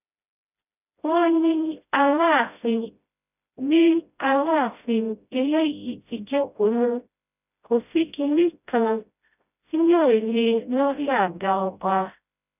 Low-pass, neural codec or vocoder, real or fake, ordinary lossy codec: 3.6 kHz; codec, 16 kHz, 0.5 kbps, FreqCodec, smaller model; fake; none